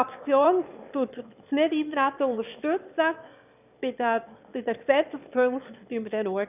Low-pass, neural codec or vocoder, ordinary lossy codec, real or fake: 3.6 kHz; autoencoder, 22.05 kHz, a latent of 192 numbers a frame, VITS, trained on one speaker; none; fake